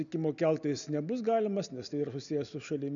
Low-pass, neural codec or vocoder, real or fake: 7.2 kHz; none; real